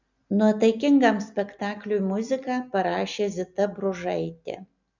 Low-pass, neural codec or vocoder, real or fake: 7.2 kHz; none; real